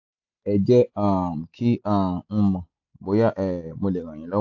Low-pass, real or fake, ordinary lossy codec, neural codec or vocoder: 7.2 kHz; real; AAC, 48 kbps; none